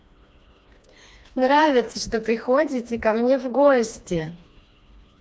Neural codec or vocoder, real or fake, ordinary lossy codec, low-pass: codec, 16 kHz, 2 kbps, FreqCodec, smaller model; fake; none; none